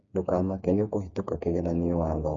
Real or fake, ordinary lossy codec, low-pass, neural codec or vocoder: fake; none; 7.2 kHz; codec, 16 kHz, 4 kbps, FreqCodec, smaller model